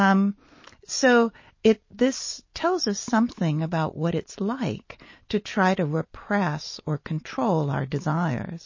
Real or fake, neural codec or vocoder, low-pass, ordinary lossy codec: real; none; 7.2 kHz; MP3, 32 kbps